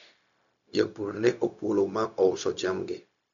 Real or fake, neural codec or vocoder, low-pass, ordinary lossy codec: fake; codec, 16 kHz, 0.4 kbps, LongCat-Audio-Codec; 7.2 kHz; none